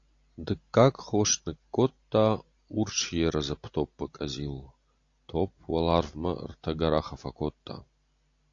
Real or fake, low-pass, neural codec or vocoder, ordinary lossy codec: real; 7.2 kHz; none; AAC, 48 kbps